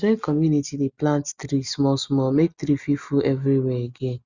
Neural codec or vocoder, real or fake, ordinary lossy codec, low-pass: none; real; Opus, 64 kbps; 7.2 kHz